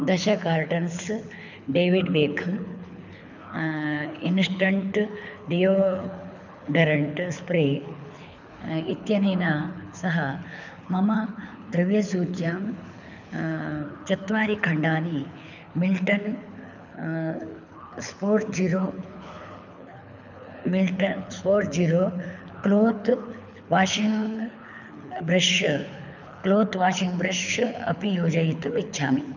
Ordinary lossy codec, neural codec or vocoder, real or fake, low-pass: none; codec, 24 kHz, 6 kbps, HILCodec; fake; 7.2 kHz